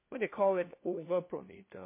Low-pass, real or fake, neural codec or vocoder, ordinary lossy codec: 3.6 kHz; fake; codec, 16 kHz, 1 kbps, FunCodec, trained on LibriTTS, 50 frames a second; MP3, 24 kbps